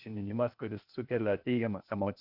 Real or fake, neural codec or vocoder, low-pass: fake; codec, 16 kHz, 0.8 kbps, ZipCodec; 5.4 kHz